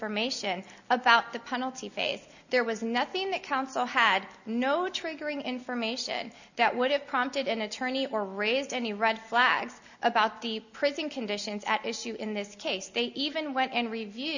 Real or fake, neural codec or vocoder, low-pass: real; none; 7.2 kHz